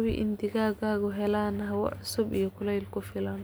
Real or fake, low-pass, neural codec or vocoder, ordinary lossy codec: real; none; none; none